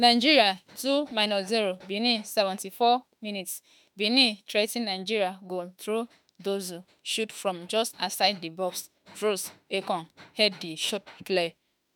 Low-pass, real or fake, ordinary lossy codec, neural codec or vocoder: none; fake; none; autoencoder, 48 kHz, 32 numbers a frame, DAC-VAE, trained on Japanese speech